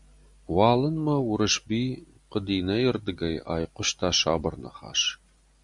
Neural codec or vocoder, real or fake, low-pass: none; real; 10.8 kHz